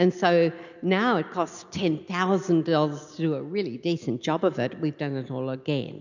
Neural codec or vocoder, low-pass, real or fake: none; 7.2 kHz; real